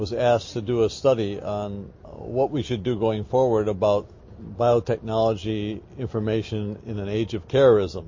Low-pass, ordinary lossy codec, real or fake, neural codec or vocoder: 7.2 kHz; MP3, 32 kbps; real; none